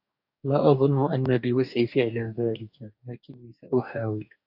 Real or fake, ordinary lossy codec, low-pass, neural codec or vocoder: fake; AAC, 48 kbps; 5.4 kHz; codec, 44.1 kHz, 2.6 kbps, DAC